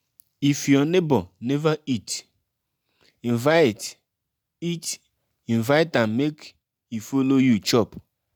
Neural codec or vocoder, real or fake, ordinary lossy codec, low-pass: none; real; none; none